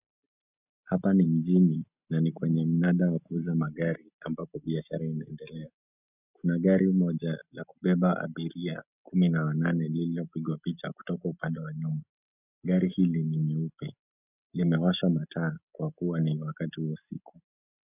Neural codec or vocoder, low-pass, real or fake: none; 3.6 kHz; real